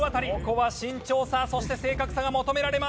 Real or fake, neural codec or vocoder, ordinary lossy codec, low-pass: real; none; none; none